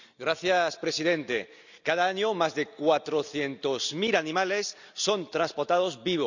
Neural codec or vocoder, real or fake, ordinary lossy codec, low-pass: none; real; none; 7.2 kHz